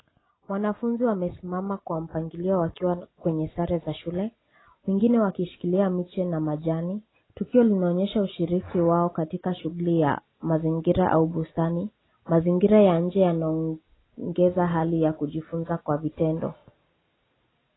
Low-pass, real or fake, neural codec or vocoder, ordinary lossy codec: 7.2 kHz; real; none; AAC, 16 kbps